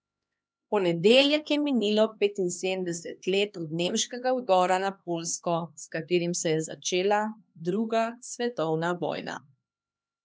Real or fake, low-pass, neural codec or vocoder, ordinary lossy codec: fake; none; codec, 16 kHz, 2 kbps, X-Codec, HuBERT features, trained on LibriSpeech; none